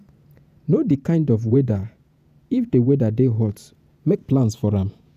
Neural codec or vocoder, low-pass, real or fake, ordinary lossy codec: vocoder, 48 kHz, 128 mel bands, Vocos; 14.4 kHz; fake; none